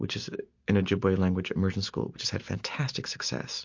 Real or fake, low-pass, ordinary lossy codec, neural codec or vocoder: real; 7.2 kHz; MP3, 48 kbps; none